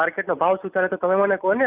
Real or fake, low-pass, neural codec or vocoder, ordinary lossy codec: real; 3.6 kHz; none; Opus, 64 kbps